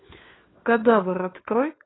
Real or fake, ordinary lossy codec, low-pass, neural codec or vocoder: fake; AAC, 16 kbps; 7.2 kHz; codec, 16 kHz, 4 kbps, FunCodec, trained on LibriTTS, 50 frames a second